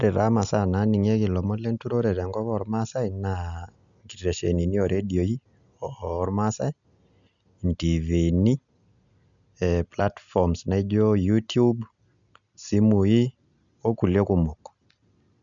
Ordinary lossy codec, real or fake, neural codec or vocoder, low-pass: none; real; none; 7.2 kHz